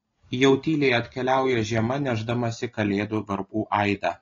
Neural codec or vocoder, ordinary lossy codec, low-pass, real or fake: none; AAC, 32 kbps; 7.2 kHz; real